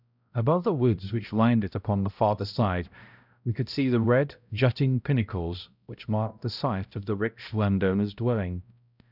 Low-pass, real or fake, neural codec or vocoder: 5.4 kHz; fake; codec, 16 kHz, 0.5 kbps, X-Codec, HuBERT features, trained on balanced general audio